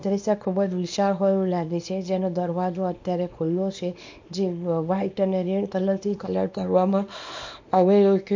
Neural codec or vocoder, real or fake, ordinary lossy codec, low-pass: codec, 24 kHz, 0.9 kbps, WavTokenizer, small release; fake; MP3, 48 kbps; 7.2 kHz